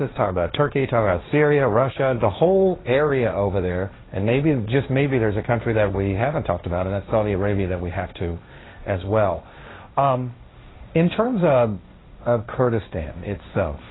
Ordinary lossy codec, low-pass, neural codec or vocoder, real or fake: AAC, 16 kbps; 7.2 kHz; codec, 16 kHz, 1.1 kbps, Voila-Tokenizer; fake